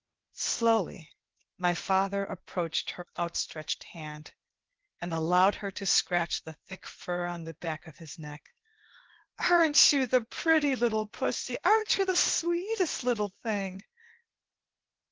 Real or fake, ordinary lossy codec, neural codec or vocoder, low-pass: fake; Opus, 16 kbps; codec, 16 kHz, 0.8 kbps, ZipCodec; 7.2 kHz